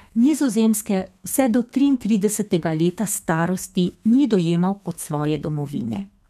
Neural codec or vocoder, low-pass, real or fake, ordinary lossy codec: codec, 32 kHz, 1.9 kbps, SNAC; 14.4 kHz; fake; none